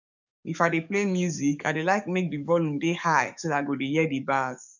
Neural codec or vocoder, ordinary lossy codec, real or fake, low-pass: codec, 44.1 kHz, 7.8 kbps, DAC; none; fake; 7.2 kHz